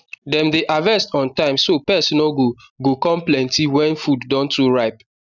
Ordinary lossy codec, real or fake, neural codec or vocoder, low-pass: none; real; none; 7.2 kHz